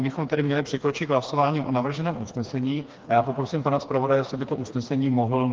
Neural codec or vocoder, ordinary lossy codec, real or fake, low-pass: codec, 16 kHz, 2 kbps, FreqCodec, smaller model; Opus, 32 kbps; fake; 7.2 kHz